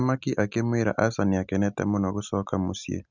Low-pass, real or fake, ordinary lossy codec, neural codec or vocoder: 7.2 kHz; real; none; none